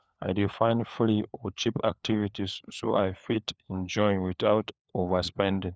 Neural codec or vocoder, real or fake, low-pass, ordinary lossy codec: codec, 16 kHz, 4 kbps, FunCodec, trained on LibriTTS, 50 frames a second; fake; none; none